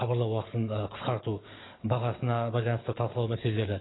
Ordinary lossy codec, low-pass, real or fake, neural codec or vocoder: AAC, 16 kbps; 7.2 kHz; fake; codec, 44.1 kHz, 7.8 kbps, DAC